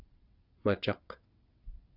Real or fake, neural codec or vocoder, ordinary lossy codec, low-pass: fake; vocoder, 22.05 kHz, 80 mel bands, WaveNeXt; Opus, 64 kbps; 5.4 kHz